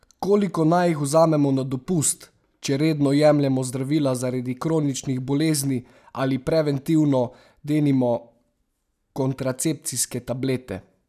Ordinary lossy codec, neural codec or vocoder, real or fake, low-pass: none; none; real; 14.4 kHz